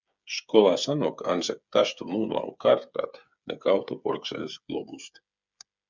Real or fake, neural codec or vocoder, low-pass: fake; codec, 16 kHz, 8 kbps, FreqCodec, smaller model; 7.2 kHz